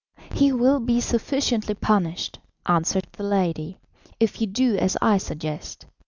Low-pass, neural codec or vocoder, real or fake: 7.2 kHz; none; real